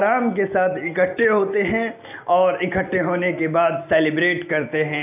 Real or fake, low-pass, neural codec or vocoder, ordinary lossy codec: real; 3.6 kHz; none; AAC, 32 kbps